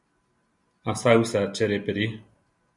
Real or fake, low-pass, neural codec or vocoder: real; 10.8 kHz; none